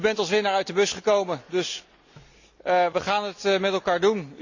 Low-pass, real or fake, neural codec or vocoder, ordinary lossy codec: 7.2 kHz; real; none; none